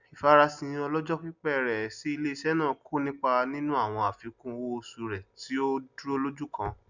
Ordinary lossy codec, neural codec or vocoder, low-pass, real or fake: none; none; 7.2 kHz; real